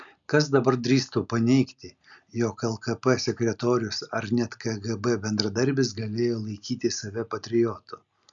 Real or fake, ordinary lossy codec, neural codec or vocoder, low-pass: real; MP3, 96 kbps; none; 7.2 kHz